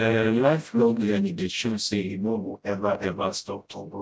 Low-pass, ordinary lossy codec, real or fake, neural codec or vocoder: none; none; fake; codec, 16 kHz, 0.5 kbps, FreqCodec, smaller model